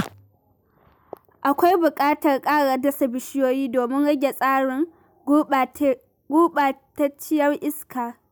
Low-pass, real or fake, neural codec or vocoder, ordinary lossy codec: none; real; none; none